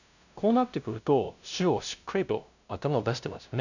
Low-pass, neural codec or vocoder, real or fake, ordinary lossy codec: 7.2 kHz; codec, 16 kHz, 0.5 kbps, FunCodec, trained on LibriTTS, 25 frames a second; fake; none